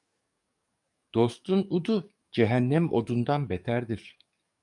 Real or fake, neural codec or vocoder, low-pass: fake; codec, 44.1 kHz, 7.8 kbps, DAC; 10.8 kHz